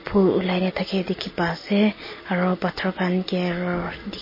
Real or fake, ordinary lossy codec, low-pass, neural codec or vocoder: real; MP3, 24 kbps; 5.4 kHz; none